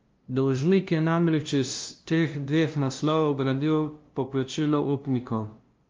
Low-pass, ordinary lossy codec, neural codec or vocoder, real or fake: 7.2 kHz; Opus, 32 kbps; codec, 16 kHz, 0.5 kbps, FunCodec, trained on LibriTTS, 25 frames a second; fake